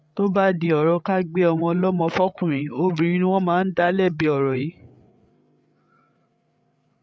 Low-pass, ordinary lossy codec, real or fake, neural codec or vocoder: none; none; fake; codec, 16 kHz, 8 kbps, FreqCodec, larger model